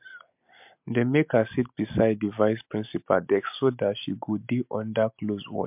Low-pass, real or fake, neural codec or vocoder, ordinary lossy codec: 3.6 kHz; real; none; MP3, 32 kbps